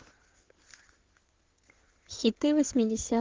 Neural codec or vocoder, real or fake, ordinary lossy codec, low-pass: codec, 16 kHz in and 24 kHz out, 2.2 kbps, FireRedTTS-2 codec; fake; Opus, 16 kbps; 7.2 kHz